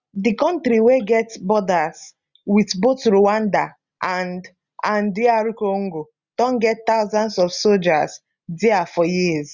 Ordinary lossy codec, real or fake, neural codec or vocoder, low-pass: Opus, 64 kbps; real; none; 7.2 kHz